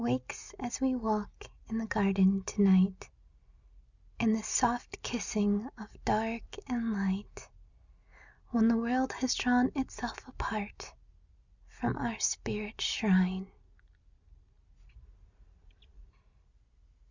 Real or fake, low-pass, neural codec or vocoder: real; 7.2 kHz; none